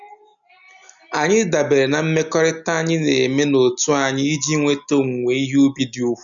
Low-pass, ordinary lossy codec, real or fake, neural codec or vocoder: 7.2 kHz; none; real; none